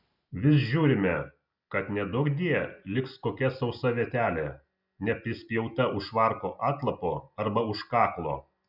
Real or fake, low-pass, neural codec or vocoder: real; 5.4 kHz; none